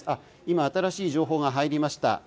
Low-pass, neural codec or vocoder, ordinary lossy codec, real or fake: none; none; none; real